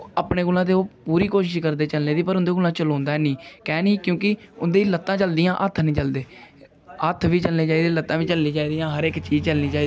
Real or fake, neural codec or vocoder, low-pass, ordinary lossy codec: real; none; none; none